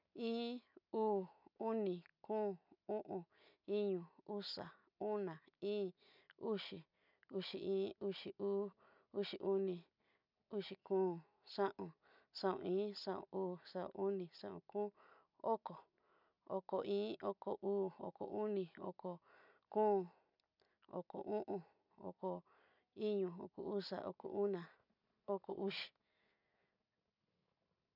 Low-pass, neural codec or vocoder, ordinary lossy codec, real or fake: 5.4 kHz; none; none; real